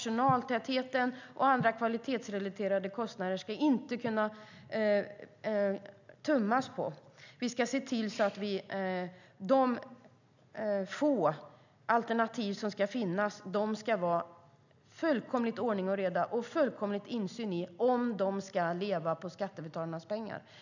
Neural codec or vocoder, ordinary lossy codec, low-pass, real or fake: none; none; 7.2 kHz; real